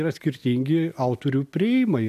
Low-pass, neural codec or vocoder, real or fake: 14.4 kHz; none; real